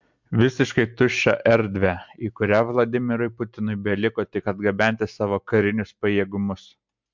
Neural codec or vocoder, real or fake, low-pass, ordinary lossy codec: none; real; 7.2 kHz; MP3, 64 kbps